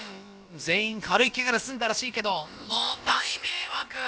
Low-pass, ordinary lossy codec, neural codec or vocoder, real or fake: none; none; codec, 16 kHz, about 1 kbps, DyCAST, with the encoder's durations; fake